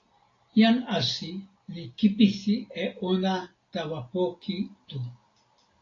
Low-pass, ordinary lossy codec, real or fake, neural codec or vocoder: 7.2 kHz; AAC, 32 kbps; real; none